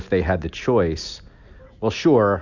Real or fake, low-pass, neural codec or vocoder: real; 7.2 kHz; none